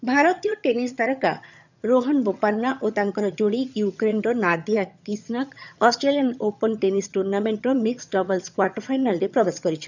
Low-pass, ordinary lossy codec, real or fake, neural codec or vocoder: 7.2 kHz; none; fake; vocoder, 22.05 kHz, 80 mel bands, HiFi-GAN